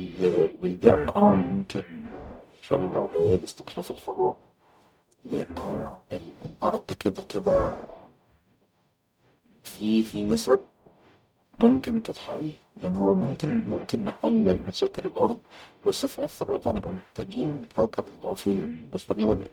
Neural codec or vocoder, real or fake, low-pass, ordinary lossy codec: codec, 44.1 kHz, 0.9 kbps, DAC; fake; 19.8 kHz; none